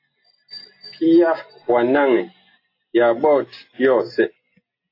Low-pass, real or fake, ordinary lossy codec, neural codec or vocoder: 5.4 kHz; real; AAC, 32 kbps; none